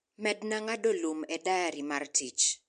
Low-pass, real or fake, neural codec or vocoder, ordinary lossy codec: 9.9 kHz; real; none; MP3, 64 kbps